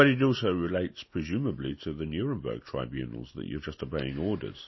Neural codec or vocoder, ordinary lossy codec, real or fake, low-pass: none; MP3, 24 kbps; real; 7.2 kHz